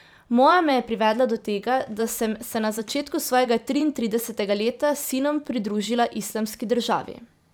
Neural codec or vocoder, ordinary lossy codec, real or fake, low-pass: vocoder, 44.1 kHz, 128 mel bands every 512 samples, BigVGAN v2; none; fake; none